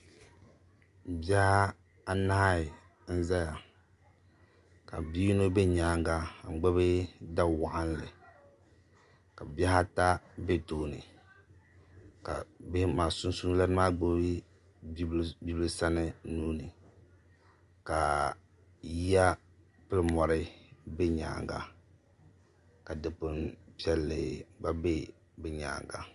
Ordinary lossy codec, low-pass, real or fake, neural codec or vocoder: Opus, 64 kbps; 10.8 kHz; real; none